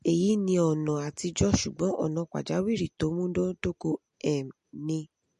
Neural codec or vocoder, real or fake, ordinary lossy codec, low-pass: none; real; MP3, 48 kbps; 14.4 kHz